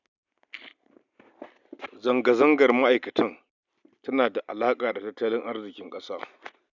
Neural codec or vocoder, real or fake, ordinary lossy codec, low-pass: none; real; none; 7.2 kHz